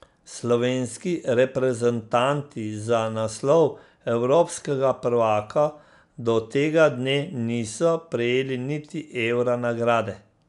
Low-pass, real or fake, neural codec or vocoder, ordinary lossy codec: 10.8 kHz; real; none; none